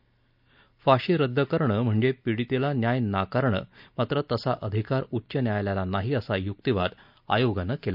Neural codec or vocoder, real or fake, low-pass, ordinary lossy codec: none; real; 5.4 kHz; none